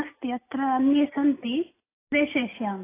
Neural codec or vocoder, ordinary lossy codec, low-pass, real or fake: none; AAC, 16 kbps; 3.6 kHz; real